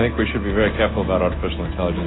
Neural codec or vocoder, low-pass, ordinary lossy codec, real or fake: none; 7.2 kHz; AAC, 16 kbps; real